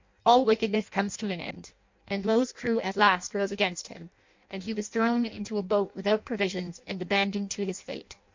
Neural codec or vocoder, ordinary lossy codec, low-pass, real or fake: codec, 16 kHz in and 24 kHz out, 0.6 kbps, FireRedTTS-2 codec; MP3, 48 kbps; 7.2 kHz; fake